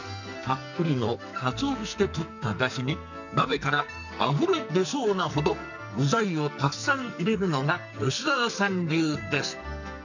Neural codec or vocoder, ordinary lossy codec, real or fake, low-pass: codec, 44.1 kHz, 2.6 kbps, SNAC; none; fake; 7.2 kHz